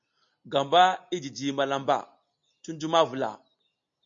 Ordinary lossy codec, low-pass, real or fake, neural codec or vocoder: MP3, 48 kbps; 7.2 kHz; real; none